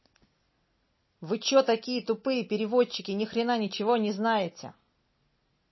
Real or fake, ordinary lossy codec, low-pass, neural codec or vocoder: real; MP3, 24 kbps; 7.2 kHz; none